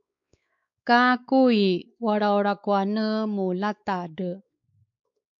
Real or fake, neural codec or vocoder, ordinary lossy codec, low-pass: fake; codec, 16 kHz, 4 kbps, X-Codec, WavLM features, trained on Multilingual LibriSpeech; AAC, 64 kbps; 7.2 kHz